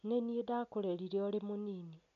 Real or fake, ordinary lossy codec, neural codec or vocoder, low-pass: real; none; none; 7.2 kHz